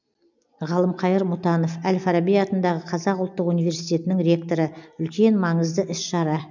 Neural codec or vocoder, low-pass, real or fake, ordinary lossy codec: none; 7.2 kHz; real; none